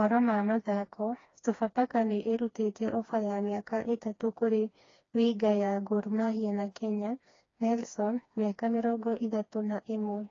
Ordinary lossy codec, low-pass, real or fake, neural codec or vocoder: AAC, 32 kbps; 7.2 kHz; fake; codec, 16 kHz, 2 kbps, FreqCodec, smaller model